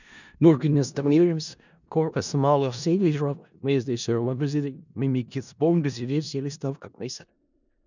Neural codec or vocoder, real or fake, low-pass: codec, 16 kHz in and 24 kHz out, 0.4 kbps, LongCat-Audio-Codec, four codebook decoder; fake; 7.2 kHz